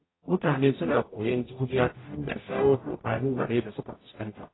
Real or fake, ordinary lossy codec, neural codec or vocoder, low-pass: fake; AAC, 16 kbps; codec, 44.1 kHz, 0.9 kbps, DAC; 7.2 kHz